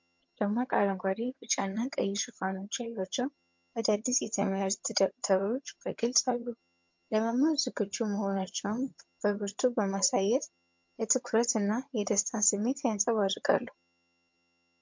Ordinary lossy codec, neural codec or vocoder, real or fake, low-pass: MP3, 48 kbps; vocoder, 22.05 kHz, 80 mel bands, HiFi-GAN; fake; 7.2 kHz